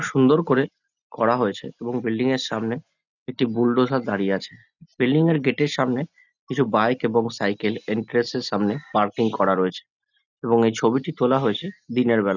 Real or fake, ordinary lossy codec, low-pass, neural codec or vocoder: real; none; 7.2 kHz; none